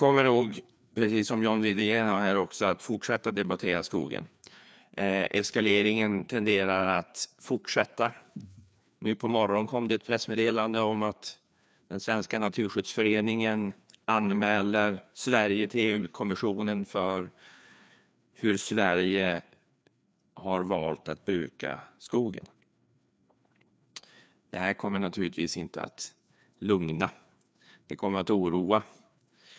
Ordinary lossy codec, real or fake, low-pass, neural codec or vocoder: none; fake; none; codec, 16 kHz, 2 kbps, FreqCodec, larger model